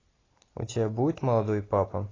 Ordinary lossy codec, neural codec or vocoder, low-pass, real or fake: AAC, 32 kbps; none; 7.2 kHz; real